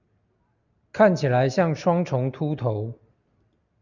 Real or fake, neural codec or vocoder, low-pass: real; none; 7.2 kHz